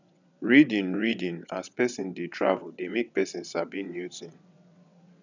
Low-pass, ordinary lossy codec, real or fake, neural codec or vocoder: 7.2 kHz; none; fake; vocoder, 22.05 kHz, 80 mel bands, WaveNeXt